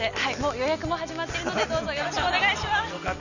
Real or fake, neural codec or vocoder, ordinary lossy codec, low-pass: real; none; none; 7.2 kHz